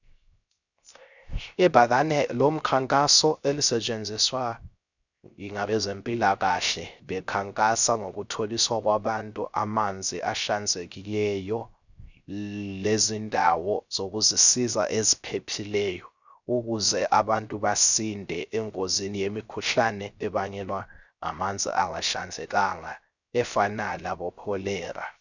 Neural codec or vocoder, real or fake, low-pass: codec, 16 kHz, 0.3 kbps, FocalCodec; fake; 7.2 kHz